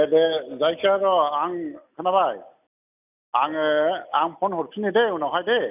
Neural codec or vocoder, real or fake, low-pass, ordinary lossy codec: none; real; 3.6 kHz; none